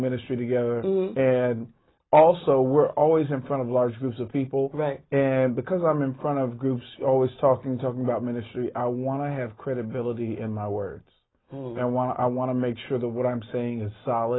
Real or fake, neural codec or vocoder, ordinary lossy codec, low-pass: real; none; AAC, 16 kbps; 7.2 kHz